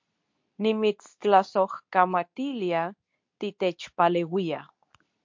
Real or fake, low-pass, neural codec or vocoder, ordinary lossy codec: fake; 7.2 kHz; codec, 24 kHz, 0.9 kbps, WavTokenizer, medium speech release version 2; MP3, 48 kbps